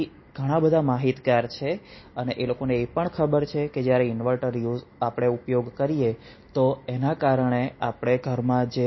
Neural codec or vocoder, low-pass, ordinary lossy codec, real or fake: none; 7.2 kHz; MP3, 24 kbps; real